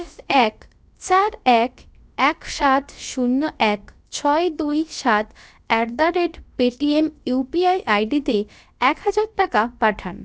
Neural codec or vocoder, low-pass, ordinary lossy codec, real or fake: codec, 16 kHz, about 1 kbps, DyCAST, with the encoder's durations; none; none; fake